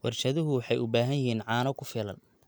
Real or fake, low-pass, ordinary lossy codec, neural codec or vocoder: real; none; none; none